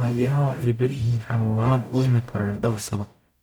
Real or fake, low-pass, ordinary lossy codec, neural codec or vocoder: fake; none; none; codec, 44.1 kHz, 0.9 kbps, DAC